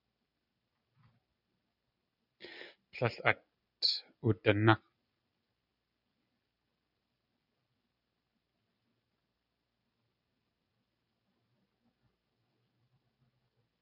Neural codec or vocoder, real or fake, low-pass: none; real; 5.4 kHz